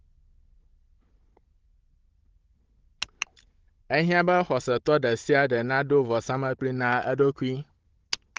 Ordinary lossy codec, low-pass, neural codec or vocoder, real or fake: Opus, 16 kbps; 7.2 kHz; codec, 16 kHz, 16 kbps, FunCodec, trained on Chinese and English, 50 frames a second; fake